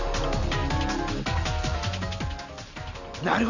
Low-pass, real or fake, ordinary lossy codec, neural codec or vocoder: 7.2 kHz; real; none; none